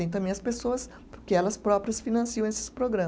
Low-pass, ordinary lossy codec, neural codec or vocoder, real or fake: none; none; none; real